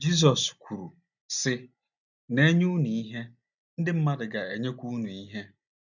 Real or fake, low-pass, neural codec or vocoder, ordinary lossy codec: real; 7.2 kHz; none; none